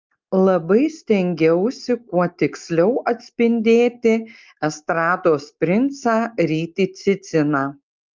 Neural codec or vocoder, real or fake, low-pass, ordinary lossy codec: none; real; 7.2 kHz; Opus, 24 kbps